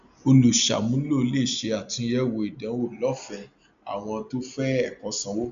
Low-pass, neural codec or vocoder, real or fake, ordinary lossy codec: 7.2 kHz; none; real; none